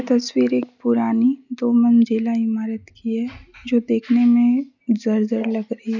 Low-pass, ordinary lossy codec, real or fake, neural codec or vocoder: 7.2 kHz; none; real; none